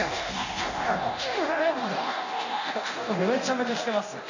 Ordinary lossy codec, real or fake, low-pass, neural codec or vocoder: none; fake; 7.2 kHz; codec, 24 kHz, 0.9 kbps, DualCodec